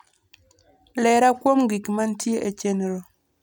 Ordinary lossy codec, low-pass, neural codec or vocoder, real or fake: none; none; none; real